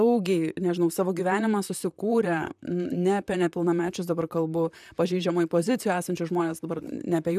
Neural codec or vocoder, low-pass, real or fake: vocoder, 44.1 kHz, 128 mel bands, Pupu-Vocoder; 14.4 kHz; fake